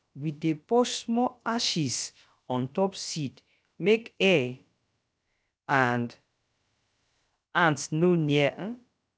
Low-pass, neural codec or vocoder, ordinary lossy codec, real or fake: none; codec, 16 kHz, about 1 kbps, DyCAST, with the encoder's durations; none; fake